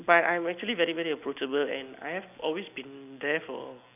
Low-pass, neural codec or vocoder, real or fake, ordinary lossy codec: 3.6 kHz; none; real; none